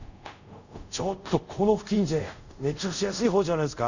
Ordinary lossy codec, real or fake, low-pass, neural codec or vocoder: none; fake; 7.2 kHz; codec, 24 kHz, 0.5 kbps, DualCodec